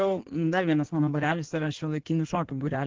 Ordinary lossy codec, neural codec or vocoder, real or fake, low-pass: Opus, 16 kbps; codec, 16 kHz in and 24 kHz out, 1.1 kbps, FireRedTTS-2 codec; fake; 7.2 kHz